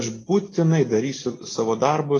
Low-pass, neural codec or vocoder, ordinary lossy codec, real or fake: 10.8 kHz; none; AAC, 32 kbps; real